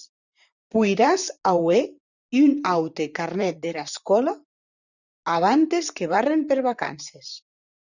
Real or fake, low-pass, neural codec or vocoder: fake; 7.2 kHz; vocoder, 44.1 kHz, 128 mel bands, Pupu-Vocoder